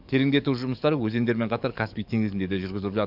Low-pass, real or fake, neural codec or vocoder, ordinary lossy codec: 5.4 kHz; fake; codec, 44.1 kHz, 7.8 kbps, DAC; none